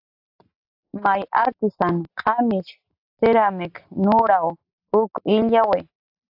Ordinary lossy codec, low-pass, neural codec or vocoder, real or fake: AAC, 48 kbps; 5.4 kHz; none; real